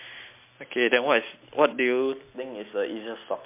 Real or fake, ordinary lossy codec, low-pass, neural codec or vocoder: real; MP3, 32 kbps; 3.6 kHz; none